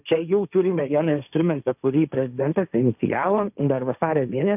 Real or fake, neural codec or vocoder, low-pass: fake; codec, 16 kHz, 1.1 kbps, Voila-Tokenizer; 3.6 kHz